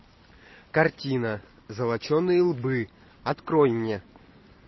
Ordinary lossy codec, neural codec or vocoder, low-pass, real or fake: MP3, 24 kbps; none; 7.2 kHz; real